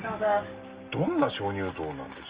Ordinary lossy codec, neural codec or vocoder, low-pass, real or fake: Opus, 24 kbps; none; 3.6 kHz; real